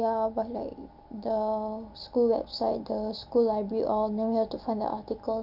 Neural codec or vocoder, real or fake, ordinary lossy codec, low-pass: none; real; none; 5.4 kHz